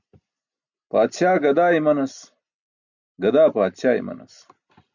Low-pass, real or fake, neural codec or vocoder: 7.2 kHz; real; none